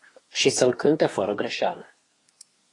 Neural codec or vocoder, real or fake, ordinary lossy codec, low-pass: codec, 24 kHz, 1 kbps, SNAC; fake; AAC, 32 kbps; 10.8 kHz